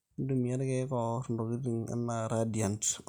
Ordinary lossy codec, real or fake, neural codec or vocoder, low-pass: none; real; none; none